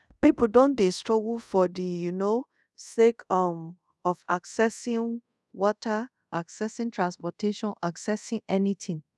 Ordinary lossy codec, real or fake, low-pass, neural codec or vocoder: none; fake; none; codec, 24 kHz, 0.5 kbps, DualCodec